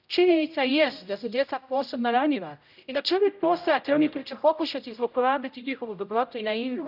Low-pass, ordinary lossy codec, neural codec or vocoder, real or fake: 5.4 kHz; none; codec, 16 kHz, 0.5 kbps, X-Codec, HuBERT features, trained on general audio; fake